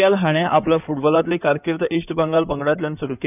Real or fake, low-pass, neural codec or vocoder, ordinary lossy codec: fake; 3.6 kHz; codec, 16 kHz in and 24 kHz out, 2.2 kbps, FireRedTTS-2 codec; none